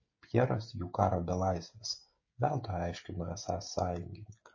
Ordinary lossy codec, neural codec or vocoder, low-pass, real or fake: MP3, 32 kbps; codec, 16 kHz, 16 kbps, FreqCodec, smaller model; 7.2 kHz; fake